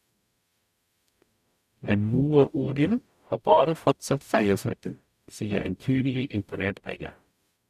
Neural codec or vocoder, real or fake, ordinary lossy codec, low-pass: codec, 44.1 kHz, 0.9 kbps, DAC; fake; none; 14.4 kHz